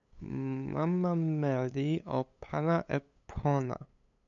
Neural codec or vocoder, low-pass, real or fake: codec, 16 kHz, 8 kbps, FunCodec, trained on LibriTTS, 25 frames a second; 7.2 kHz; fake